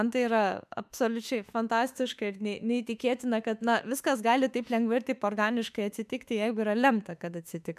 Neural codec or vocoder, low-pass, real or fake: autoencoder, 48 kHz, 32 numbers a frame, DAC-VAE, trained on Japanese speech; 14.4 kHz; fake